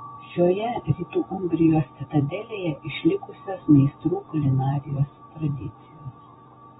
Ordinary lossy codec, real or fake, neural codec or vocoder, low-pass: AAC, 16 kbps; fake; vocoder, 44.1 kHz, 128 mel bands every 256 samples, BigVGAN v2; 19.8 kHz